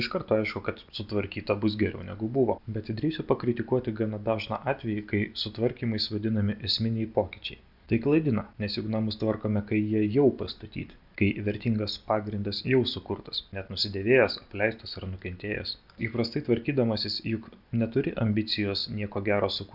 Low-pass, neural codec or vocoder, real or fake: 5.4 kHz; none; real